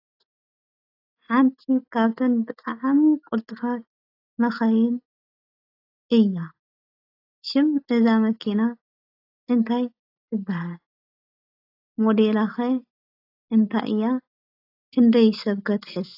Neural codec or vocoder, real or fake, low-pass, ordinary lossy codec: none; real; 5.4 kHz; AAC, 32 kbps